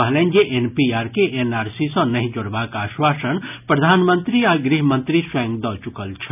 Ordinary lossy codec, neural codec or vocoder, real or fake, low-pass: none; none; real; 3.6 kHz